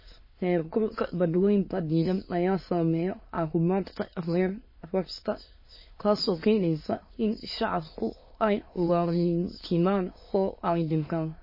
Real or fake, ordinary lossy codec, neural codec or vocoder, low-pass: fake; MP3, 24 kbps; autoencoder, 22.05 kHz, a latent of 192 numbers a frame, VITS, trained on many speakers; 5.4 kHz